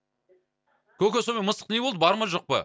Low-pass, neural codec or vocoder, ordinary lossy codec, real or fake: none; none; none; real